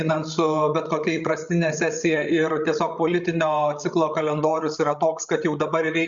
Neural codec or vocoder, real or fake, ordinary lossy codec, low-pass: codec, 16 kHz, 16 kbps, FreqCodec, larger model; fake; Opus, 64 kbps; 7.2 kHz